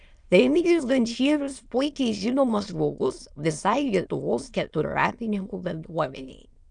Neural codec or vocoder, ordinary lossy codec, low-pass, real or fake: autoencoder, 22.05 kHz, a latent of 192 numbers a frame, VITS, trained on many speakers; Opus, 64 kbps; 9.9 kHz; fake